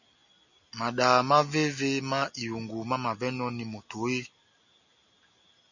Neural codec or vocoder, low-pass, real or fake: none; 7.2 kHz; real